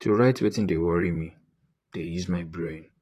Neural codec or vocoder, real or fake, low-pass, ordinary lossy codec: vocoder, 44.1 kHz, 128 mel bands, Pupu-Vocoder; fake; 14.4 kHz; AAC, 48 kbps